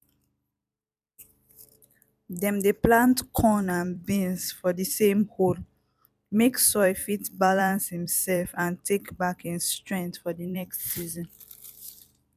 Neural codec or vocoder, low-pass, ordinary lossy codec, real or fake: vocoder, 44.1 kHz, 128 mel bands every 256 samples, BigVGAN v2; 14.4 kHz; none; fake